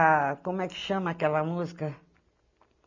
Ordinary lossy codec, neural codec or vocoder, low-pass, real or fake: none; none; 7.2 kHz; real